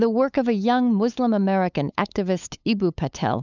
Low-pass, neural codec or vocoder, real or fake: 7.2 kHz; codec, 16 kHz, 8 kbps, FunCodec, trained on LibriTTS, 25 frames a second; fake